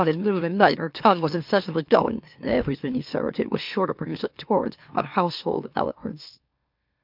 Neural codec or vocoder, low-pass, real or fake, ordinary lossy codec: autoencoder, 44.1 kHz, a latent of 192 numbers a frame, MeloTTS; 5.4 kHz; fake; MP3, 48 kbps